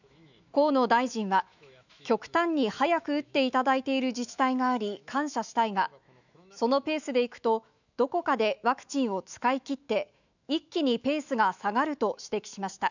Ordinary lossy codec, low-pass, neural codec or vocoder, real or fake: none; 7.2 kHz; autoencoder, 48 kHz, 128 numbers a frame, DAC-VAE, trained on Japanese speech; fake